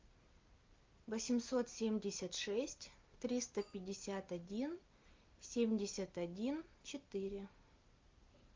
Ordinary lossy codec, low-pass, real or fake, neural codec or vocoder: Opus, 24 kbps; 7.2 kHz; real; none